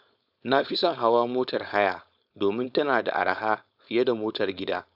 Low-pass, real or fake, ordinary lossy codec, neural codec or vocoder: 5.4 kHz; fake; AAC, 48 kbps; codec, 16 kHz, 4.8 kbps, FACodec